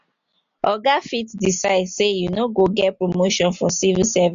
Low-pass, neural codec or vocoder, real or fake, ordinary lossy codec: 7.2 kHz; none; real; MP3, 64 kbps